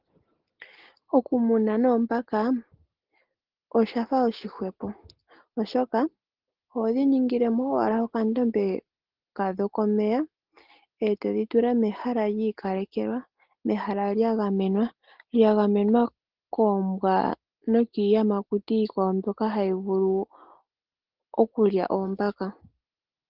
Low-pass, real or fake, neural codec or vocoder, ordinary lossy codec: 5.4 kHz; real; none; Opus, 16 kbps